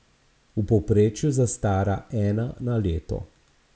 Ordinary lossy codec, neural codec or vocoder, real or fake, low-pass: none; none; real; none